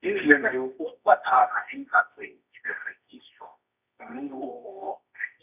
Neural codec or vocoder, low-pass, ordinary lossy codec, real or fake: codec, 24 kHz, 0.9 kbps, WavTokenizer, medium music audio release; 3.6 kHz; none; fake